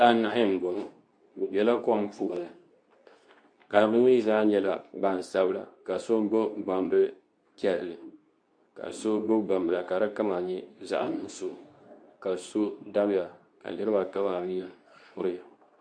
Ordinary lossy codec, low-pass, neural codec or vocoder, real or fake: AAC, 64 kbps; 9.9 kHz; codec, 24 kHz, 0.9 kbps, WavTokenizer, medium speech release version 2; fake